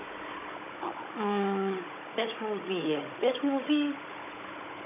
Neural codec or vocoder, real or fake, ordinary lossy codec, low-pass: codec, 16 kHz, 16 kbps, FunCodec, trained on Chinese and English, 50 frames a second; fake; none; 3.6 kHz